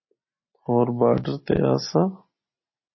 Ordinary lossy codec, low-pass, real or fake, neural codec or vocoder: MP3, 24 kbps; 7.2 kHz; real; none